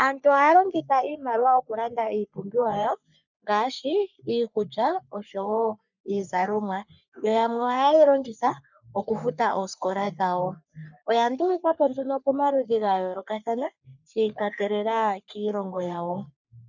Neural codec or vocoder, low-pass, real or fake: codec, 44.1 kHz, 3.4 kbps, Pupu-Codec; 7.2 kHz; fake